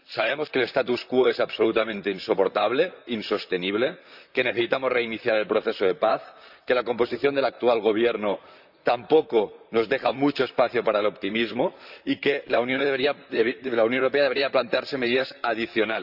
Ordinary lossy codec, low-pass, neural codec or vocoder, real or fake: none; 5.4 kHz; vocoder, 44.1 kHz, 128 mel bands, Pupu-Vocoder; fake